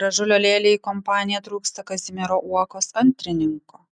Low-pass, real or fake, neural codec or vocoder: 9.9 kHz; real; none